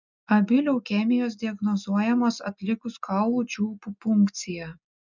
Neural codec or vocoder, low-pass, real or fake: none; 7.2 kHz; real